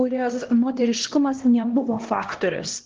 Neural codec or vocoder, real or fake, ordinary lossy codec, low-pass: codec, 16 kHz, 1 kbps, X-Codec, HuBERT features, trained on LibriSpeech; fake; Opus, 16 kbps; 7.2 kHz